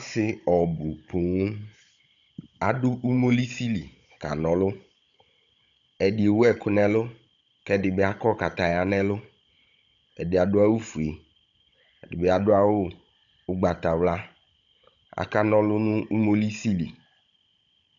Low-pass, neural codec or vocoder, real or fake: 7.2 kHz; codec, 16 kHz, 16 kbps, FunCodec, trained on Chinese and English, 50 frames a second; fake